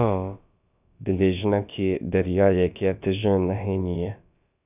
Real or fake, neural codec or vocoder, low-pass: fake; codec, 16 kHz, about 1 kbps, DyCAST, with the encoder's durations; 3.6 kHz